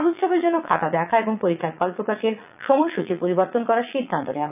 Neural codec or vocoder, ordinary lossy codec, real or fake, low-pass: vocoder, 22.05 kHz, 80 mel bands, Vocos; none; fake; 3.6 kHz